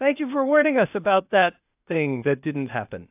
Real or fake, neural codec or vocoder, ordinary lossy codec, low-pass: fake; codec, 16 kHz, 0.8 kbps, ZipCodec; AAC, 32 kbps; 3.6 kHz